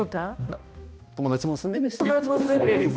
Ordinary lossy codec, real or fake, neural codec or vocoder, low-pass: none; fake; codec, 16 kHz, 1 kbps, X-Codec, HuBERT features, trained on balanced general audio; none